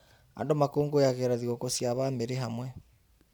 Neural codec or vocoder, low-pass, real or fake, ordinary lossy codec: vocoder, 44.1 kHz, 128 mel bands every 512 samples, BigVGAN v2; none; fake; none